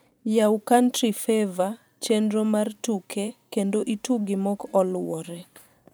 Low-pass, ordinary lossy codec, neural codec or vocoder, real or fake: none; none; vocoder, 44.1 kHz, 128 mel bands every 512 samples, BigVGAN v2; fake